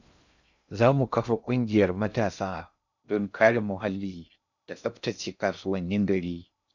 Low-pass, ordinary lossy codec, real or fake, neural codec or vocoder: 7.2 kHz; none; fake; codec, 16 kHz in and 24 kHz out, 0.6 kbps, FocalCodec, streaming, 2048 codes